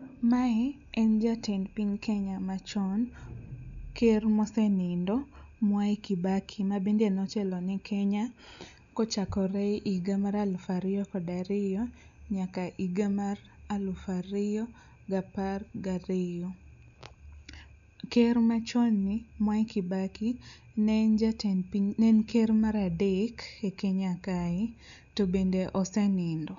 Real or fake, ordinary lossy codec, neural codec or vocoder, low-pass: real; none; none; 7.2 kHz